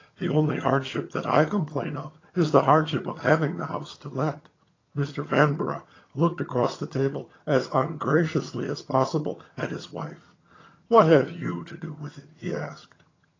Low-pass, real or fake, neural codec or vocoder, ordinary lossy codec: 7.2 kHz; fake; vocoder, 22.05 kHz, 80 mel bands, HiFi-GAN; AAC, 32 kbps